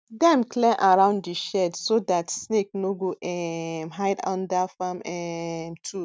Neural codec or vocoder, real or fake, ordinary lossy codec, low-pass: none; real; none; none